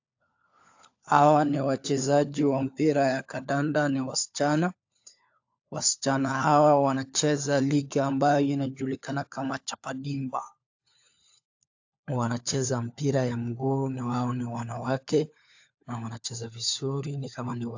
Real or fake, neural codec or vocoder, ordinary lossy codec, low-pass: fake; codec, 16 kHz, 4 kbps, FunCodec, trained on LibriTTS, 50 frames a second; AAC, 48 kbps; 7.2 kHz